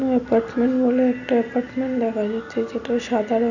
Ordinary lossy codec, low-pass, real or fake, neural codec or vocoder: none; 7.2 kHz; real; none